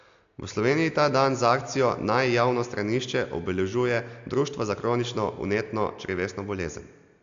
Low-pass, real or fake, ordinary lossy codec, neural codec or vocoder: 7.2 kHz; real; AAC, 64 kbps; none